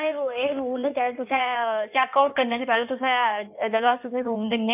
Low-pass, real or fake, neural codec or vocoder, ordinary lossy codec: 3.6 kHz; fake; codec, 16 kHz in and 24 kHz out, 1.1 kbps, FireRedTTS-2 codec; none